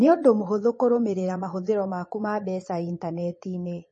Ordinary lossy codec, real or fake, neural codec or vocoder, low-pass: MP3, 32 kbps; fake; vocoder, 22.05 kHz, 80 mel bands, Vocos; 9.9 kHz